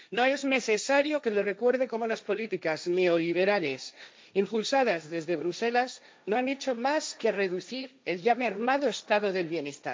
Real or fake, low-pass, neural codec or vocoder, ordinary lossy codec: fake; none; codec, 16 kHz, 1.1 kbps, Voila-Tokenizer; none